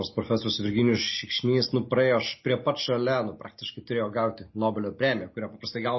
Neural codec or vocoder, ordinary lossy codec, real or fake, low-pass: none; MP3, 24 kbps; real; 7.2 kHz